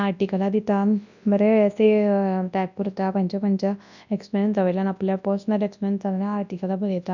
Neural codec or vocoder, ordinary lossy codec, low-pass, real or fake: codec, 24 kHz, 0.9 kbps, WavTokenizer, large speech release; none; 7.2 kHz; fake